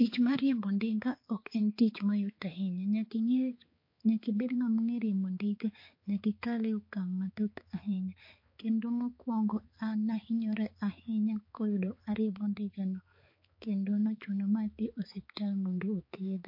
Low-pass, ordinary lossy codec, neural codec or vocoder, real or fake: 5.4 kHz; MP3, 32 kbps; codec, 16 kHz, 4 kbps, X-Codec, HuBERT features, trained on balanced general audio; fake